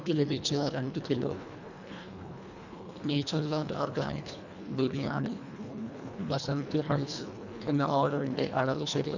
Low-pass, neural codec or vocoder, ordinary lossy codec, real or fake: 7.2 kHz; codec, 24 kHz, 1.5 kbps, HILCodec; none; fake